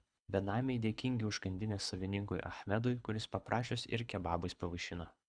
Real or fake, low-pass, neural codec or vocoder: fake; 9.9 kHz; codec, 24 kHz, 6 kbps, HILCodec